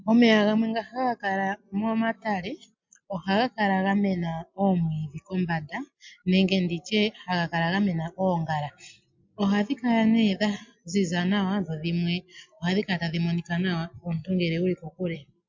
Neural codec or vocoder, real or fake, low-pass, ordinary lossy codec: none; real; 7.2 kHz; MP3, 48 kbps